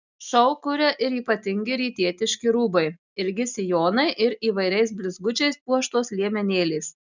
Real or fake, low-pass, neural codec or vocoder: real; 7.2 kHz; none